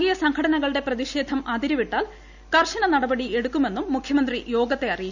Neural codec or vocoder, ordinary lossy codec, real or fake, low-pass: none; none; real; 7.2 kHz